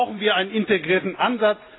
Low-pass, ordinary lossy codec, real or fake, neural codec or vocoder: 7.2 kHz; AAC, 16 kbps; real; none